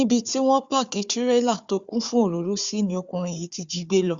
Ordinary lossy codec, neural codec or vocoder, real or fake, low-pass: Opus, 64 kbps; codec, 16 kHz, 4 kbps, FunCodec, trained on Chinese and English, 50 frames a second; fake; 7.2 kHz